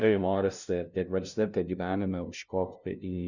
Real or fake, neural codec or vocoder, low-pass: fake; codec, 16 kHz, 0.5 kbps, FunCodec, trained on LibriTTS, 25 frames a second; 7.2 kHz